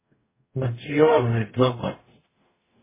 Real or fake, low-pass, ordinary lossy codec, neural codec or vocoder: fake; 3.6 kHz; MP3, 16 kbps; codec, 44.1 kHz, 0.9 kbps, DAC